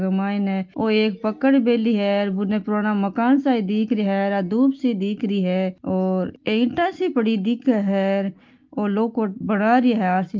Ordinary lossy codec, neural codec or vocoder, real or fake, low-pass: Opus, 32 kbps; none; real; 7.2 kHz